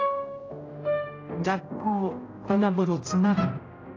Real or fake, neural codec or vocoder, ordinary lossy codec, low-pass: fake; codec, 16 kHz, 0.5 kbps, X-Codec, HuBERT features, trained on general audio; AAC, 32 kbps; 7.2 kHz